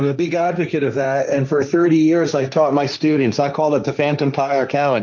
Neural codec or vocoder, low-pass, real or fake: codec, 16 kHz, 1.1 kbps, Voila-Tokenizer; 7.2 kHz; fake